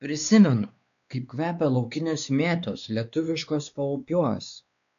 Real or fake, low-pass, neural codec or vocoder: fake; 7.2 kHz; codec, 16 kHz, 2 kbps, X-Codec, WavLM features, trained on Multilingual LibriSpeech